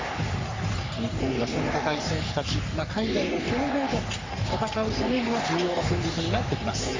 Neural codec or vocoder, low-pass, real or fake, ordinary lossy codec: codec, 44.1 kHz, 3.4 kbps, Pupu-Codec; 7.2 kHz; fake; MP3, 64 kbps